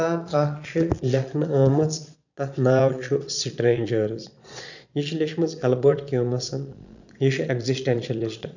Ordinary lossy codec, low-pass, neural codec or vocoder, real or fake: none; 7.2 kHz; vocoder, 22.05 kHz, 80 mel bands, Vocos; fake